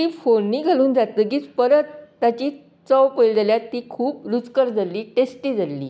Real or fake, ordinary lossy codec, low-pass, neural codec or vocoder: real; none; none; none